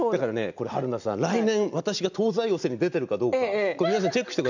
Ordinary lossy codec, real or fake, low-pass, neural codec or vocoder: none; real; 7.2 kHz; none